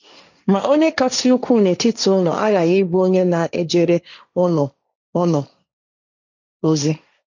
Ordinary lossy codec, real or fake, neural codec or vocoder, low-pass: none; fake; codec, 16 kHz, 1.1 kbps, Voila-Tokenizer; 7.2 kHz